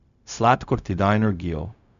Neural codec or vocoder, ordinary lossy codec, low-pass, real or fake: codec, 16 kHz, 0.4 kbps, LongCat-Audio-Codec; none; 7.2 kHz; fake